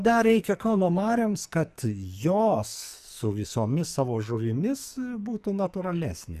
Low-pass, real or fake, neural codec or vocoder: 14.4 kHz; fake; codec, 44.1 kHz, 2.6 kbps, SNAC